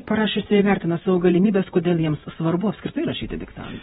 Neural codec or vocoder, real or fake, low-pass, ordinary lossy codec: vocoder, 48 kHz, 128 mel bands, Vocos; fake; 19.8 kHz; AAC, 16 kbps